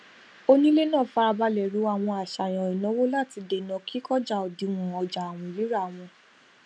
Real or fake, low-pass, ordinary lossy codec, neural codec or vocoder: real; 9.9 kHz; none; none